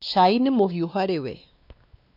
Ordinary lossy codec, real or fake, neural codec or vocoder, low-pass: none; fake; codec, 16 kHz, 2 kbps, X-Codec, WavLM features, trained on Multilingual LibriSpeech; 5.4 kHz